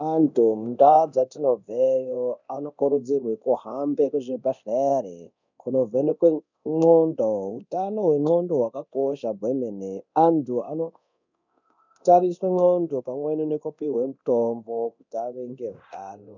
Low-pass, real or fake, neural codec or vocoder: 7.2 kHz; fake; codec, 24 kHz, 0.9 kbps, DualCodec